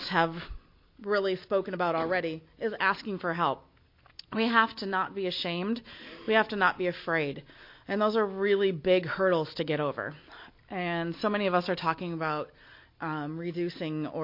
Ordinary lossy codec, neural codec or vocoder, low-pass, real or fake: MP3, 32 kbps; none; 5.4 kHz; real